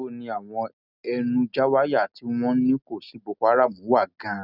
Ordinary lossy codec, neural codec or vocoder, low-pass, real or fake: none; none; 5.4 kHz; real